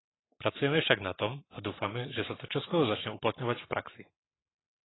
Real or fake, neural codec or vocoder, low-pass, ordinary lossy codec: fake; codec, 44.1 kHz, 7.8 kbps, Pupu-Codec; 7.2 kHz; AAC, 16 kbps